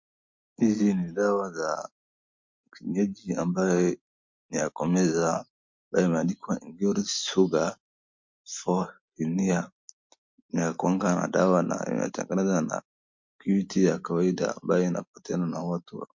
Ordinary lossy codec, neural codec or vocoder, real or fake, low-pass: MP3, 48 kbps; none; real; 7.2 kHz